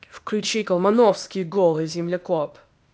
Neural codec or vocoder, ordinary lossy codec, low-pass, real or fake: codec, 16 kHz, 0.8 kbps, ZipCodec; none; none; fake